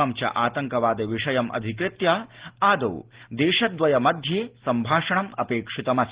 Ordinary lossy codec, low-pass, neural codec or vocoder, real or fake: Opus, 16 kbps; 3.6 kHz; none; real